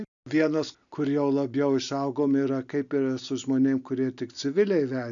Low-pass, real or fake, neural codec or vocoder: 7.2 kHz; real; none